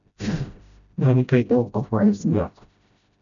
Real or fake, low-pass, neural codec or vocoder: fake; 7.2 kHz; codec, 16 kHz, 0.5 kbps, FreqCodec, smaller model